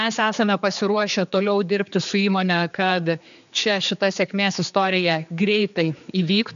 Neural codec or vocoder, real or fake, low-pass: codec, 16 kHz, 4 kbps, X-Codec, HuBERT features, trained on general audio; fake; 7.2 kHz